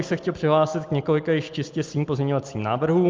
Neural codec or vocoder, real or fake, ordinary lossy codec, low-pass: none; real; Opus, 24 kbps; 7.2 kHz